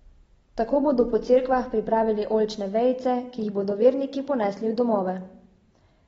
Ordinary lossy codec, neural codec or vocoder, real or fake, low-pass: AAC, 24 kbps; none; real; 19.8 kHz